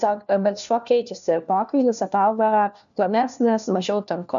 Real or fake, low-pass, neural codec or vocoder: fake; 7.2 kHz; codec, 16 kHz, 1 kbps, FunCodec, trained on LibriTTS, 50 frames a second